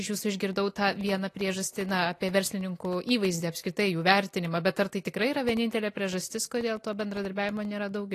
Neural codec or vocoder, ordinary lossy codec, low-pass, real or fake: none; AAC, 48 kbps; 14.4 kHz; real